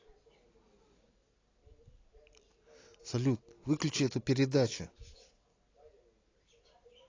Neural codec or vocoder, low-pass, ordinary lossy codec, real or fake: none; 7.2 kHz; AAC, 32 kbps; real